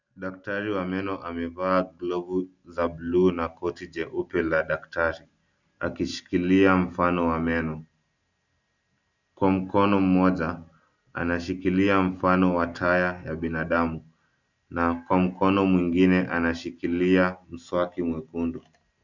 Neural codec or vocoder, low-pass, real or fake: none; 7.2 kHz; real